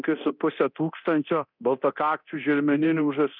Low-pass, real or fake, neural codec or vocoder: 5.4 kHz; fake; codec, 24 kHz, 0.9 kbps, DualCodec